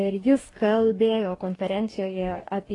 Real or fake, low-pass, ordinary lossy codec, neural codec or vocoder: fake; 10.8 kHz; AAC, 32 kbps; codec, 44.1 kHz, 2.6 kbps, DAC